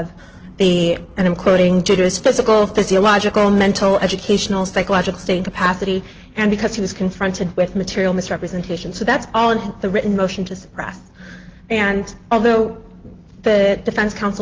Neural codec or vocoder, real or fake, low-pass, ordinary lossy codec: none; real; 7.2 kHz; Opus, 16 kbps